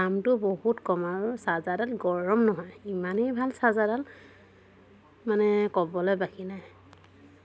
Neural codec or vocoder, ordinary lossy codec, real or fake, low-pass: none; none; real; none